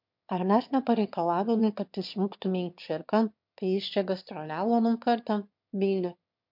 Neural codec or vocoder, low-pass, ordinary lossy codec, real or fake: autoencoder, 22.05 kHz, a latent of 192 numbers a frame, VITS, trained on one speaker; 5.4 kHz; MP3, 48 kbps; fake